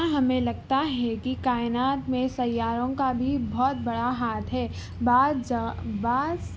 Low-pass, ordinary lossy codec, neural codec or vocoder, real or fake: none; none; none; real